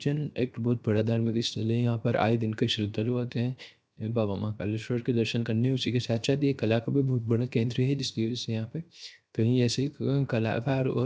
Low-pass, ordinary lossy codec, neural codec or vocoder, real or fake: none; none; codec, 16 kHz, 0.7 kbps, FocalCodec; fake